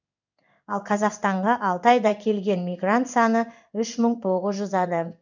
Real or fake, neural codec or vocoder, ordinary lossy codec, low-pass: fake; codec, 16 kHz in and 24 kHz out, 1 kbps, XY-Tokenizer; none; 7.2 kHz